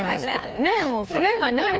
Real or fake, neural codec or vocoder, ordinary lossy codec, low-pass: fake; codec, 16 kHz, 2 kbps, FreqCodec, larger model; none; none